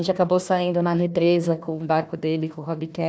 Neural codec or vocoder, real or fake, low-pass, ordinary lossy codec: codec, 16 kHz, 1 kbps, FunCodec, trained on Chinese and English, 50 frames a second; fake; none; none